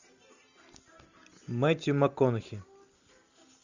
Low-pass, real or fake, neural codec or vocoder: 7.2 kHz; real; none